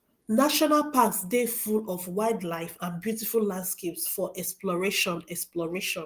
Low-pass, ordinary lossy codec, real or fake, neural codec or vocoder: 14.4 kHz; Opus, 24 kbps; real; none